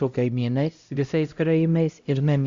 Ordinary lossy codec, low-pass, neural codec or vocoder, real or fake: Opus, 64 kbps; 7.2 kHz; codec, 16 kHz, 0.5 kbps, X-Codec, HuBERT features, trained on LibriSpeech; fake